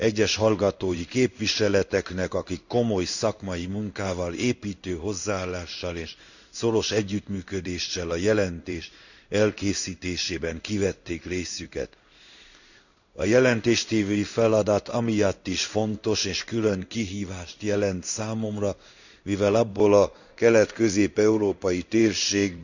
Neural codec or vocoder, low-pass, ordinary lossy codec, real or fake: codec, 16 kHz in and 24 kHz out, 1 kbps, XY-Tokenizer; 7.2 kHz; none; fake